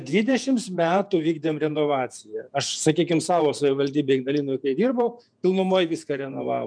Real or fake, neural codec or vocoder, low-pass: fake; vocoder, 48 kHz, 128 mel bands, Vocos; 9.9 kHz